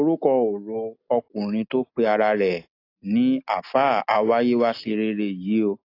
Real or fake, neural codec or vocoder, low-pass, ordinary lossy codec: real; none; 5.4 kHz; AAC, 32 kbps